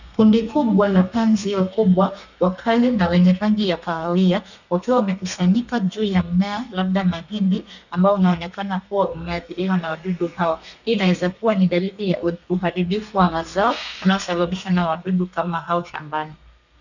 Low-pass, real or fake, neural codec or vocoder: 7.2 kHz; fake; codec, 32 kHz, 1.9 kbps, SNAC